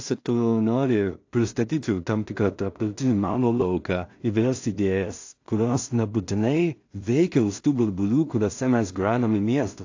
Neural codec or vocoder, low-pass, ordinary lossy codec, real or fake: codec, 16 kHz in and 24 kHz out, 0.4 kbps, LongCat-Audio-Codec, two codebook decoder; 7.2 kHz; AAC, 48 kbps; fake